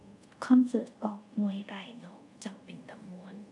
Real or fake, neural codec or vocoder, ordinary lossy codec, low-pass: fake; codec, 24 kHz, 0.5 kbps, DualCodec; none; 10.8 kHz